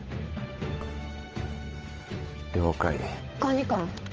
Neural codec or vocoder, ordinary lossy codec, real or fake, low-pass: codec, 16 kHz, 8 kbps, FunCodec, trained on Chinese and English, 25 frames a second; Opus, 24 kbps; fake; 7.2 kHz